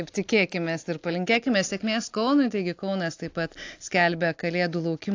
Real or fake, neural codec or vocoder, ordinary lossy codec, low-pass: real; none; AAC, 48 kbps; 7.2 kHz